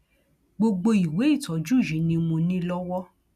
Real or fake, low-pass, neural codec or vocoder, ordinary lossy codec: real; 14.4 kHz; none; none